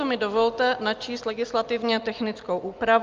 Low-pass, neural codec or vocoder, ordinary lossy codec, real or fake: 7.2 kHz; none; Opus, 24 kbps; real